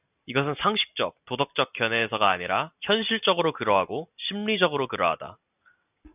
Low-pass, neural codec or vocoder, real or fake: 3.6 kHz; none; real